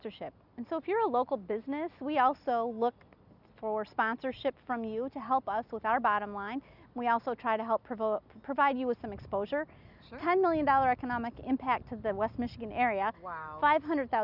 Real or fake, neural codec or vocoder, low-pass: real; none; 5.4 kHz